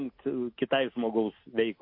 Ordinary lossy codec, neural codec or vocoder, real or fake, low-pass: MP3, 32 kbps; none; real; 5.4 kHz